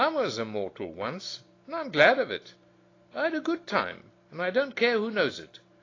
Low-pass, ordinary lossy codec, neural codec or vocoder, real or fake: 7.2 kHz; AAC, 32 kbps; none; real